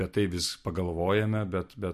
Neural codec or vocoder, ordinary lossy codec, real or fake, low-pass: none; MP3, 64 kbps; real; 14.4 kHz